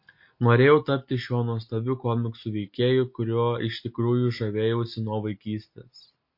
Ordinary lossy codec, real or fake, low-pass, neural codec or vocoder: MP3, 32 kbps; real; 5.4 kHz; none